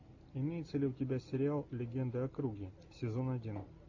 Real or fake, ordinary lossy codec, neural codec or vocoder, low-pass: real; AAC, 48 kbps; none; 7.2 kHz